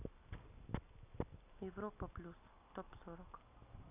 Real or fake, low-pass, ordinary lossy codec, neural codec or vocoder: real; 3.6 kHz; none; none